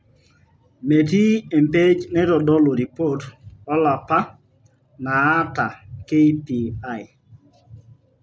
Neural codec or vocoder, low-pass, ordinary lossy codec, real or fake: none; none; none; real